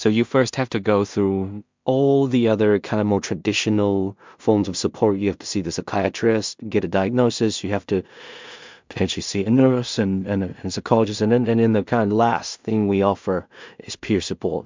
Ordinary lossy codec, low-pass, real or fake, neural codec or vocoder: MP3, 64 kbps; 7.2 kHz; fake; codec, 16 kHz in and 24 kHz out, 0.4 kbps, LongCat-Audio-Codec, two codebook decoder